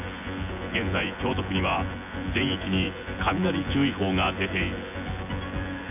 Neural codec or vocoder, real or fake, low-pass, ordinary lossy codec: vocoder, 24 kHz, 100 mel bands, Vocos; fake; 3.6 kHz; none